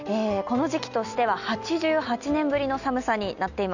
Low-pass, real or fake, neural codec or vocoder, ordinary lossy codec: 7.2 kHz; real; none; none